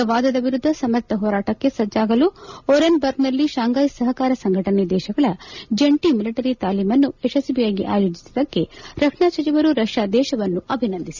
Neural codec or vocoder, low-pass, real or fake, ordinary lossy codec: none; 7.2 kHz; real; none